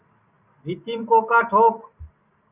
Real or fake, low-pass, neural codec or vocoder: real; 3.6 kHz; none